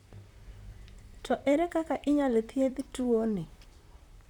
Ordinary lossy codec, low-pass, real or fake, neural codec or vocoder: none; 19.8 kHz; fake; vocoder, 44.1 kHz, 128 mel bands, Pupu-Vocoder